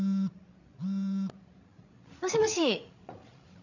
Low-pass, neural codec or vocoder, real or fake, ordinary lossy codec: 7.2 kHz; codec, 16 kHz, 16 kbps, FreqCodec, larger model; fake; none